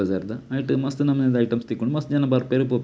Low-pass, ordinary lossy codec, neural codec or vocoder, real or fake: none; none; none; real